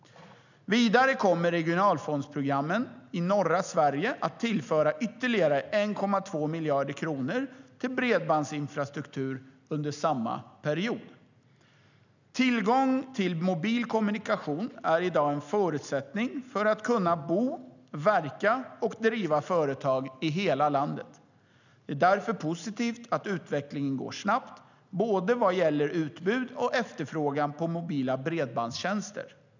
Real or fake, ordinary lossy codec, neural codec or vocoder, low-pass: real; none; none; 7.2 kHz